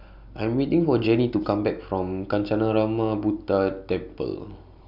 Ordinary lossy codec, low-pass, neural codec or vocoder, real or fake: none; 5.4 kHz; none; real